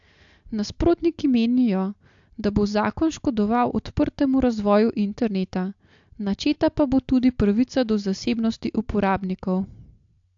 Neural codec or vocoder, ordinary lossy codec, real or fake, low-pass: none; AAC, 64 kbps; real; 7.2 kHz